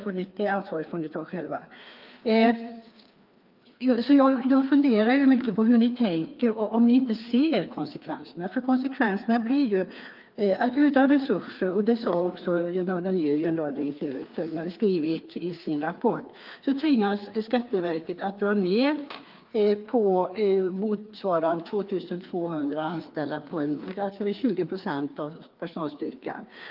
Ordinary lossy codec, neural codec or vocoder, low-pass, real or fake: Opus, 32 kbps; codec, 16 kHz, 2 kbps, FreqCodec, larger model; 5.4 kHz; fake